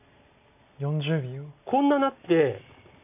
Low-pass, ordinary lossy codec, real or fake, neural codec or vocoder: 3.6 kHz; none; real; none